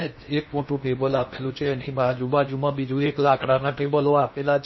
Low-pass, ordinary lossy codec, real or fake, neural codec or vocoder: 7.2 kHz; MP3, 24 kbps; fake; codec, 16 kHz in and 24 kHz out, 0.8 kbps, FocalCodec, streaming, 65536 codes